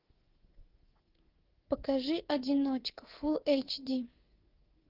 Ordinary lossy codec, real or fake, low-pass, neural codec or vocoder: Opus, 16 kbps; fake; 5.4 kHz; codec, 24 kHz, 3.1 kbps, DualCodec